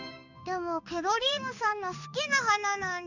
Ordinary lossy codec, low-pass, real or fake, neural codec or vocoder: none; 7.2 kHz; fake; codec, 16 kHz in and 24 kHz out, 1 kbps, XY-Tokenizer